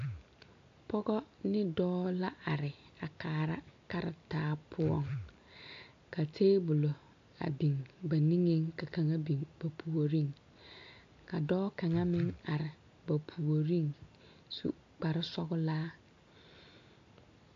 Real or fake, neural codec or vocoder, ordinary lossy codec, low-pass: real; none; AAC, 48 kbps; 7.2 kHz